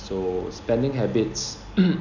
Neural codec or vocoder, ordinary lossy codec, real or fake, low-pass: none; none; real; 7.2 kHz